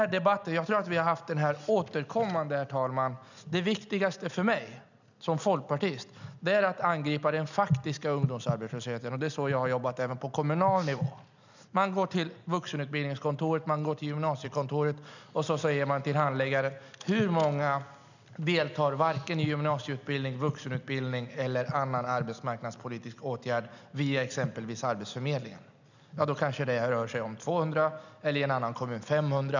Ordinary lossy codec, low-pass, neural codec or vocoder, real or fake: none; 7.2 kHz; none; real